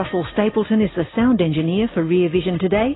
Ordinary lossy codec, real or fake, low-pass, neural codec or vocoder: AAC, 16 kbps; real; 7.2 kHz; none